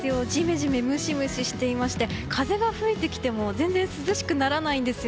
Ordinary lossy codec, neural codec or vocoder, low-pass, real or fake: none; none; none; real